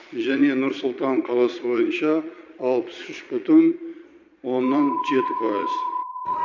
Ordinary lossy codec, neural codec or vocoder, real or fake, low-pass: none; vocoder, 22.05 kHz, 80 mel bands, Vocos; fake; 7.2 kHz